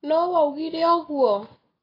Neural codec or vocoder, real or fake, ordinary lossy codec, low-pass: none; real; AAC, 24 kbps; 5.4 kHz